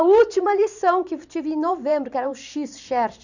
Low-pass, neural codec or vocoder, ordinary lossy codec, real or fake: 7.2 kHz; vocoder, 44.1 kHz, 128 mel bands every 512 samples, BigVGAN v2; none; fake